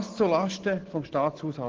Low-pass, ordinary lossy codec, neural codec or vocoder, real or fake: 7.2 kHz; Opus, 16 kbps; none; real